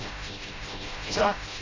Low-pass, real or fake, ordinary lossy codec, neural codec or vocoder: 7.2 kHz; fake; AAC, 32 kbps; codec, 16 kHz, 0.5 kbps, FreqCodec, smaller model